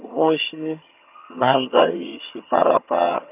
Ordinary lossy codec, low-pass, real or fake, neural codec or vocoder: none; 3.6 kHz; fake; vocoder, 22.05 kHz, 80 mel bands, HiFi-GAN